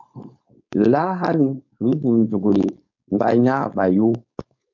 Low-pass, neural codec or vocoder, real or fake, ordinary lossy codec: 7.2 kHz; codec, 16 kHz, 4.8 kbps, FACodec; fake; MP3, 48 kbps